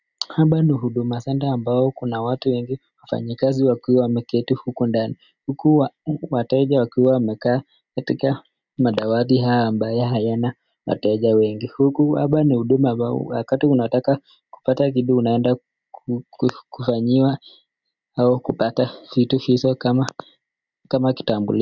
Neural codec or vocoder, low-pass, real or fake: none; 7.2 kHz; real